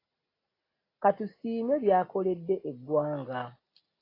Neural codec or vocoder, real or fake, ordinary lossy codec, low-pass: none; real; AAC, 24 kbps; 5.4 kHz